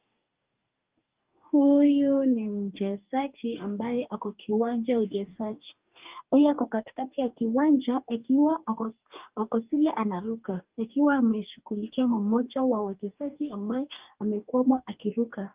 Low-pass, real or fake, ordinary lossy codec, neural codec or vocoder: 3.6 kHz; fake; Opus, 24 kbps; codec, 44.1 kHz, 2.6 kbps, DAC